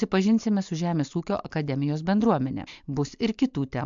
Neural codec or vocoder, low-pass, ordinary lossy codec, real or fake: none; 7.2 kHz; MP3, 64 kbps; real